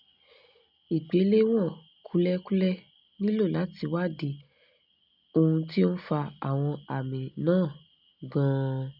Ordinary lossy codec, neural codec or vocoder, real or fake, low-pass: none; none; real; 5.4 kHz